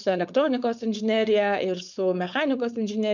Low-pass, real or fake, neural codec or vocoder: 7.2 kHz; fake; codec, 16 kHz, 4.8 kbps, FACodec